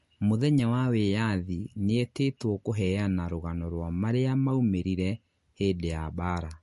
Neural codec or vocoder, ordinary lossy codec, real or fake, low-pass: none; MP3, 48 kbps; real; 14.4 kHz